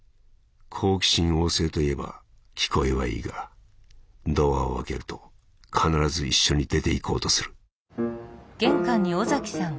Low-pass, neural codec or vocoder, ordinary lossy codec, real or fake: none; none; none; real